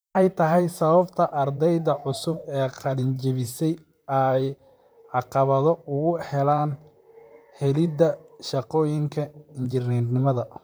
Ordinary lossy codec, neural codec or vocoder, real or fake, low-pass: none; vocoder, 44.1 kHz, 128 mel bands, Pupu-Vocoder; fake; none